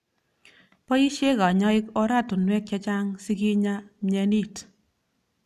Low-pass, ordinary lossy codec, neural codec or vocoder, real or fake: 14.4 kHz; none; none; real